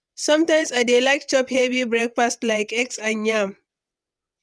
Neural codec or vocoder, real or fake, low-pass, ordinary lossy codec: vocoder, 22.05 kHz, 80 mel bands, WaveNeXt; fake; none; none